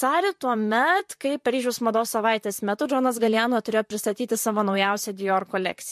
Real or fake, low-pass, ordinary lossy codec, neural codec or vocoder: fake; 14.4 kHz; MP3, 64 kbps; vocoder, 44.1 kHz, 128 mel bands, Pupu-Vocoder